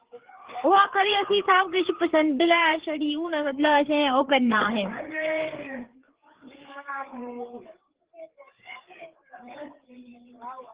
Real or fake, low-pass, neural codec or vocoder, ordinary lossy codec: fake; 3.6 kHz; codec, 16 kHz, 4 kbps, FreqCodec, larger model; Opus, 16 kbps